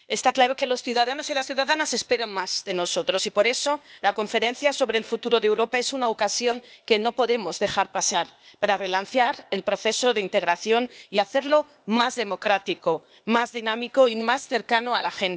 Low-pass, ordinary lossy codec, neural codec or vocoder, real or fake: none; none; codec, 16 kHz, 0.8 kbps, ZipCodec; fake